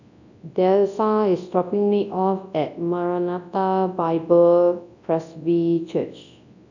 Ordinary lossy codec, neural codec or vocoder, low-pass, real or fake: none; codec, 24 kHz, 0.9 kbps, WavTokenizer, large speech release; 7.2 kHz; fake